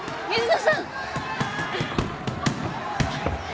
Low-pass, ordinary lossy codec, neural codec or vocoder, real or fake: none; none; none; real